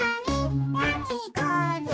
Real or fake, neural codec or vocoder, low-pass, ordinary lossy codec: fake; codec, 16 kHz, 1 kbps, X-Codec, HuBERT features, trained on general audio; none; none